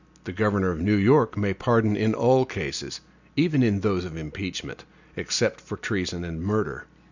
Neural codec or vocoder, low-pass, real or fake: none; 7.2 kHz; real